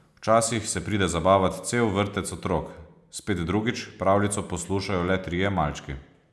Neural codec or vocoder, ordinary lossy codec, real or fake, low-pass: none; none; real; none